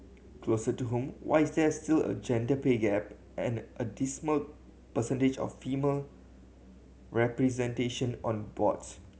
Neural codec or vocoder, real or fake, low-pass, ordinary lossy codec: none; real; none; none